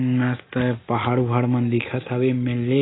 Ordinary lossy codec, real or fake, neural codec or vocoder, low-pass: AAC, 16 kbps; real; none; 7.2 kHz